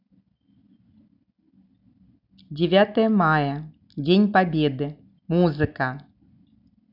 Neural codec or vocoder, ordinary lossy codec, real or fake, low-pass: none; none; real; 5.4 kHz